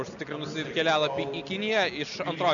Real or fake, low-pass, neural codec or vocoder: real; 7.2 kHz; none